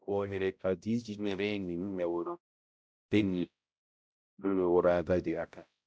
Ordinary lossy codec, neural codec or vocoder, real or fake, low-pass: none; codec, 16 kHz, 0.5 kbps, X-Codec, HuBERT features, trained on balanced general audio; fake; none